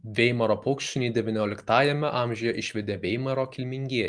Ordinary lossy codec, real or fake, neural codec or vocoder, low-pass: Opus, 24 kbps; real; none; 9.9 kHz